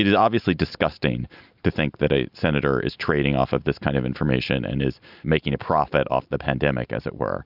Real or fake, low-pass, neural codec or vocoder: real; 5.4 kHz; none